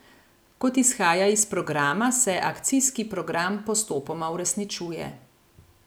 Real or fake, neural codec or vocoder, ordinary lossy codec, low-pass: real; none; none; none